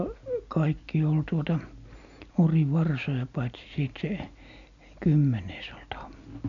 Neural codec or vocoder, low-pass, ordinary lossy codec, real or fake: none; 7.2 kHz; none; real